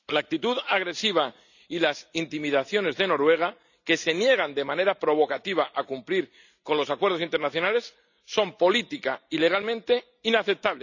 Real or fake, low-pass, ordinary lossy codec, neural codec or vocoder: real; 7.2 kHz; none; none